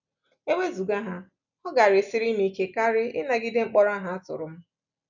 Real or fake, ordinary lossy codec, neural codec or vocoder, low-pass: real; none; none; 7.2 kHz